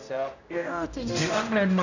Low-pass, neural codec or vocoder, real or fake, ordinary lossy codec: 7.2 kHz; codec, 16 kHz, 0.5 kbps, X-Codec, HuBERT features, trained on general audio; fake; none